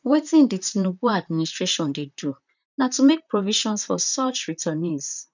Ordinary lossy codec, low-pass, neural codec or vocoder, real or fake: none; 7.2 kHz; vocoder, 44.1 kHz, 128 mel bands, Pupu-Vocoder; fake